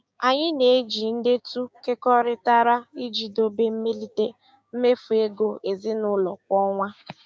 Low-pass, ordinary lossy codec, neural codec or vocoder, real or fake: none; none; codec, 16 kHz, 6 kbps, DAC; fake